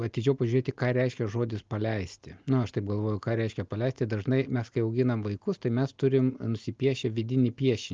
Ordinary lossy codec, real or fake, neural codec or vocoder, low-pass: Opus, 32 kbps; real; none; 7.2 kHz